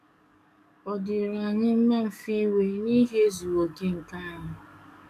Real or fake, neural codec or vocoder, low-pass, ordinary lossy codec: fake; codec, 44.1 kHz, 7.8 kbps, DAC; 14.4 kHz; none